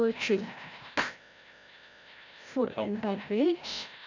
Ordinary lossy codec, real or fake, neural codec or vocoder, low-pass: none; fake; codec, 16 kHz, 0.5 kbps, FreqCodec, larger model; 7.2 kHz